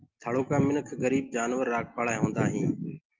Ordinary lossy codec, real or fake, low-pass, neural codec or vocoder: Opus, 32 kbps; real; 7.2 kHz; none